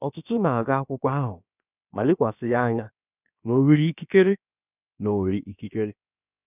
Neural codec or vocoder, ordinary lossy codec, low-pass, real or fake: codec, 16 kHz, about 1 kbps, DyCAST, with the encoder's durations; none; 3.6 kHz; fake